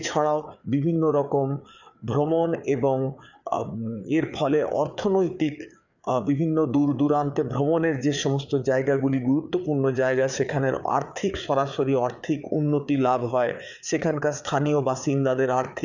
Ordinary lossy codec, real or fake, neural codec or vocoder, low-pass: none; fake; codec, 16 kHz, 4 kbps, FreqCodec, larger model; 7.2 kHz